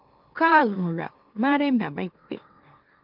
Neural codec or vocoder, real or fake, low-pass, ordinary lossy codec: autoencoder, 44.1 kHz, a latent of 192 numbers a frame, MeloTTS; fake; 5.4 kHz; Opus, 32 kbps